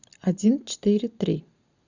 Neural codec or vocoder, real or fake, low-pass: none; real; 7.2 kHz